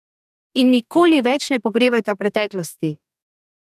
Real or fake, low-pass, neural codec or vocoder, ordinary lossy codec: fake; 14.4 kHz; codec, 44.1 kHz, 2.6 kbps, DAC; none